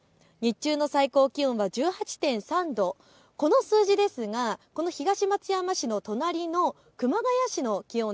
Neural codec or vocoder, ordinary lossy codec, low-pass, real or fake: none; none; none; real